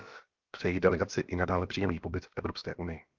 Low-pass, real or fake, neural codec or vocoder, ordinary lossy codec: 7.2 kHz; fake; codec, 16 kHz, about 1 kbps, DyCAST, with the encoder's durations; Opus, 32 kbps